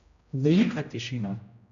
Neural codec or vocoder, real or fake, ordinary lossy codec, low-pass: codec, 16 kHz, 0.5 kbps, X-Codec, HuBERT features, trained on general audio; fake; AAC, 96 kbps; 7.2 kHz